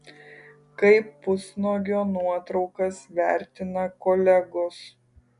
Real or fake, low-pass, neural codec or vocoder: real; 10.8 kHz; none